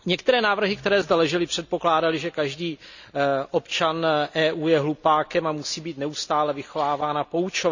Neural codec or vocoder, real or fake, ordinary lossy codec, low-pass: none; real; none; 7.2 kHz